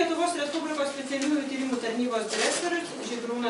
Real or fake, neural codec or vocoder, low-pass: real; none; 10.8 kHz